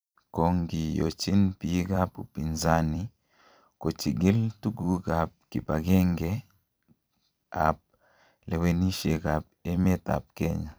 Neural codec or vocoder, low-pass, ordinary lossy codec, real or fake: vocoder, 44.1 kHz, 128 mel bands every 256 samples, BigVGAN v2; none; none; fake